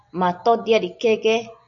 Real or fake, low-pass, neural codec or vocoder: real; 7.2 kHz; none